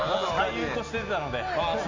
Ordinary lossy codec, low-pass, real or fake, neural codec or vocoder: MP3, 64 kbps; 7.2 kHz; real; none